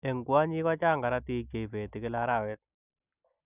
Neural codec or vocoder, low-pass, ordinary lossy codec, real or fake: none; 3.6 kHz; none; real